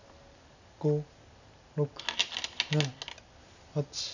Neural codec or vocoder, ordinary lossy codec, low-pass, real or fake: none; none; 7.2 kHz; real